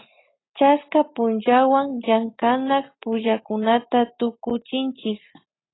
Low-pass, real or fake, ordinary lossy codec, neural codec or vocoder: 7.2 kHz; real; AAC, 16 kbps; none